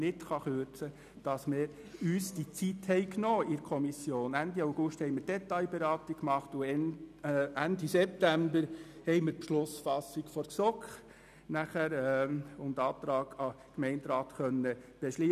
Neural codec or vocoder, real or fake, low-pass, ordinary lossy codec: none; real; 14.4 kHz; none